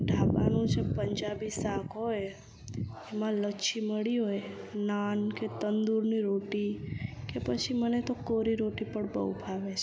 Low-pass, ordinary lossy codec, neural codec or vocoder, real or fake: none; none; none; real